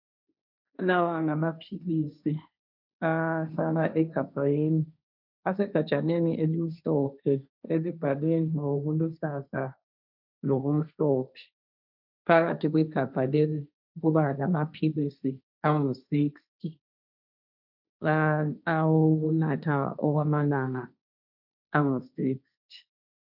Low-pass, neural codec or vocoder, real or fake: 5.4 kHz; codec, 16 kHz, 1.1 kbps, Voila-Tokenizer; fake